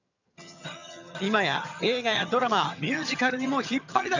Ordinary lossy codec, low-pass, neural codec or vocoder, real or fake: none; 7.2 kHz; vocoder, 22.05 kHz, 80 mel bands, HiFi-GAN; fake